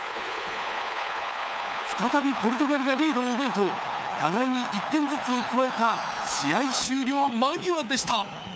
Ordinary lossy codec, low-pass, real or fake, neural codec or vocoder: none; none; fake; codec, 16 kHz, 4 kbps, FunCodec, trained on LibriTTS, 50 frames a second